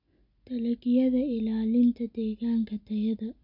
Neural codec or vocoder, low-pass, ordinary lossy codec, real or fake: none; 5.4 kHz; none; real